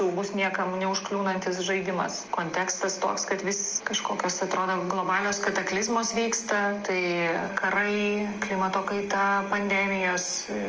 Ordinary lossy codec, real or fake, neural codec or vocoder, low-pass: Opus, 24 kbps; real; none; 7.2 kHz